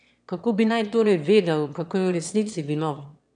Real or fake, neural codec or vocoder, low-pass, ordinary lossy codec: fake; autoencoder, 22.05 kHz, a latent of 192 numbers a frame, VITS, trained on one speaker; 9.9 kHz; none